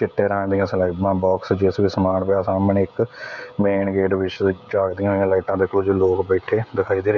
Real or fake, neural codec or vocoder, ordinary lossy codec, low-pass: fake; codec, 16 kHz, 16 kbps, FreqCodec, smaller model; none; 7.2 kHz